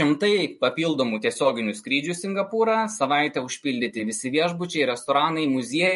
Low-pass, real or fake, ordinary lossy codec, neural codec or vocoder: 14.4 kHz; real; MP3, 48 kbps; none